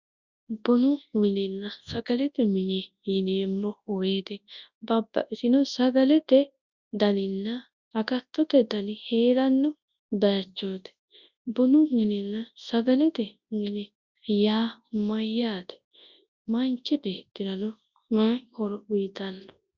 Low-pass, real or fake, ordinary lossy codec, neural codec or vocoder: 7.2 kHz; fake; Opus, 64 kbps; codec, 24 kHz, 0.9 kbps, WavTokenizer, large speech release